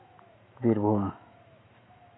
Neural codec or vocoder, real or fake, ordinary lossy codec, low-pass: autoencoder, 48 kHz, 128 numbers a frame, DAC-VAE, trained on Japanese speech; fake; AAC, 16 kbps; 7.2 kHz